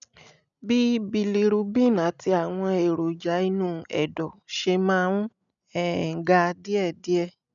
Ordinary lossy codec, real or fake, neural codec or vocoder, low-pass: none; real; none; 7.2 kHz